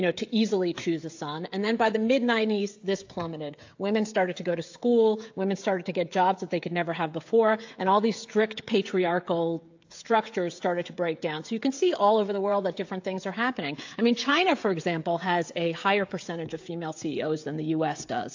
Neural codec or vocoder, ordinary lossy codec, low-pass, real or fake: codec, 16 kHz, 16 kbps, FreqCodec, smaller model; AAC, 48 kbps; 7.2 kHz; fake